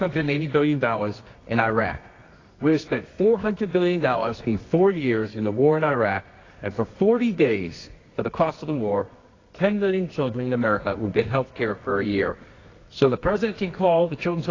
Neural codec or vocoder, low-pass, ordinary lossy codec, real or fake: codec, 24 kHz, 0.9 kbps, WavTokenizer, medium music audio release; 7.2 kHz; AAC, 32 kbps; fake